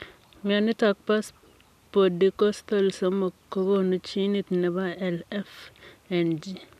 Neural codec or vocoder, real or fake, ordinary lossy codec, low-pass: none; real; none; 14.4 kHz